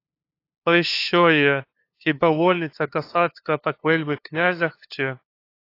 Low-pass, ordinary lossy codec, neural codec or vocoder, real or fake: 5.4 kHz; AAC, 32 kbps; codec, 16 kHz, 2 kbps, FunCodec, trained on LibriTTS, 25 frames a second; fake